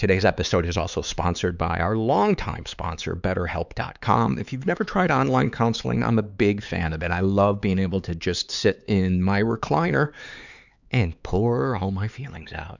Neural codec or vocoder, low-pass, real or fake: codec, 16 kHz, 4 kbps, X-Codec, HuBERT features, trained on LibriSpeech; 7.2 kHz; fake